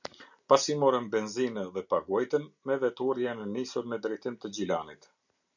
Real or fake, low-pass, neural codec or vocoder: real; 7.2 kHz; none